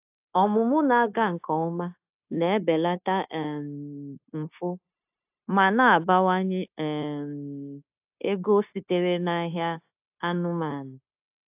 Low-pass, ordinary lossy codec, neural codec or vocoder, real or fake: 3.6 kHz; AAC, 32 kbps; codec, 16 kHz, 0.9 kbps, LongCat-Audio-Codec; fake